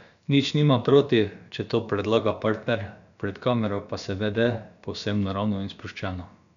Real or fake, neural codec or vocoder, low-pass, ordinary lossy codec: fake; codec, 16 kHz, about 1 kbps, DyCAST, with the encoder's durations; 7.2 kHz; none